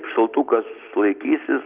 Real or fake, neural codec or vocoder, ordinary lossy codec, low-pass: real; none; Opus, 32 kbps; 3.6 kHz